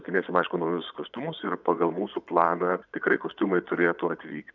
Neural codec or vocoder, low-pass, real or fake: vocoder, 22.05 kHz, 80 mel bands, Vocos; 7.2 kHz; fake